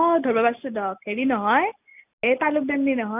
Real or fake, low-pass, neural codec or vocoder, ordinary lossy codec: real; 3.6 kHz; none; none